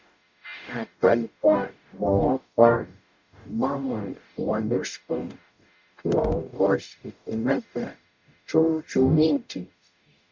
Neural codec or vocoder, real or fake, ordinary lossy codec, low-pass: codec, 44.1 kHz, 0.9 kbps, DAC; fake; MP3, 64 kbps; 7.2 kHz